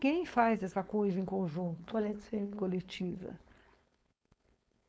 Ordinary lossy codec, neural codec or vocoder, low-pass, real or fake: none; codec, 16 kHz, 4.8 kbps, FACodec; none; fake